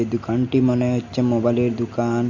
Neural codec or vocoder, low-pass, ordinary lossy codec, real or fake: none; 7.2 kHz; MP3, 48 kbps; real